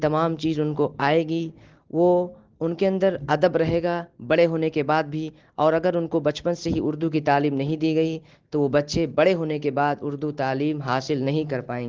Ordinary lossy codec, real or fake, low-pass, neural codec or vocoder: Opus, 16 kbps; real; 7.2 kHz; none